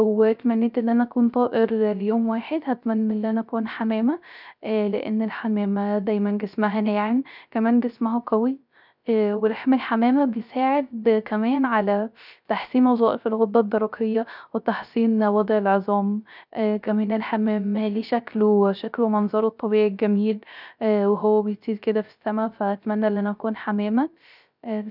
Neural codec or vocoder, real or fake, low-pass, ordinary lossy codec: codec, 16 kHz, 0.3 kbps, FocalCodec; fake; 5.4 kHz; none